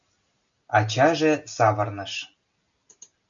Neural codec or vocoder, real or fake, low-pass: none; real; 7.2 kHz